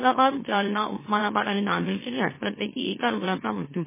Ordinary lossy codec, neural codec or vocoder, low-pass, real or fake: MP3, 16 kbps; autoencoder, 44.1 kHz, a latent of 192 numbers a frame, MeloTTS; 3.6 kHz; fake